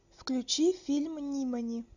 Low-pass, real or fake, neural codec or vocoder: 7.2 kHz; real; none